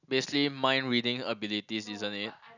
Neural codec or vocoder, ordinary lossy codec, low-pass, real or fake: none; none; 7.2 kHz; real